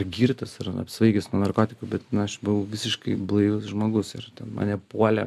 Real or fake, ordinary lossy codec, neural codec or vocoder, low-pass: real; AAC, 96 kbps; none; 14.4 kHz